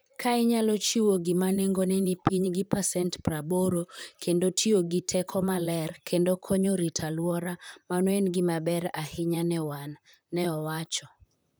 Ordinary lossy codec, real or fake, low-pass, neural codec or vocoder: none; fake; none; vocoder, 44.1 kHz, 128 mel bands, Pupu-Vocoder